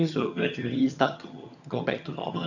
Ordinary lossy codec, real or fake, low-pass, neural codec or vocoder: none; fake; 7.2 kHz; vocoder, 22.05 kHz, 80 mel bands, HiFi-GAN